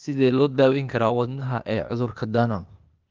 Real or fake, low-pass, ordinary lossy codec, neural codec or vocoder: fake; 7.2 kHz; Opus, 24 kbps; codec, 16 kHz, 0.8 kbps, ZipCodec